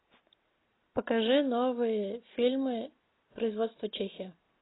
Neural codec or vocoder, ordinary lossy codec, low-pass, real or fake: none; AAC, 16 kbps; 7.2 kHz; real